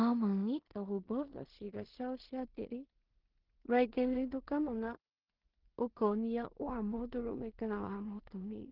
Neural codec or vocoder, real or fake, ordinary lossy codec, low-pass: codec, 16 kHz in and 24 kHz out, 0.4 kbps, LongCat-Audio-Codec, two codebook decoder; fake; Opus, 16 kbps; 5.4 kHz